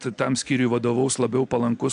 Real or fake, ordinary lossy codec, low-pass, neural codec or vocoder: real; AAC, 64 kbps; 9.9 kHz; none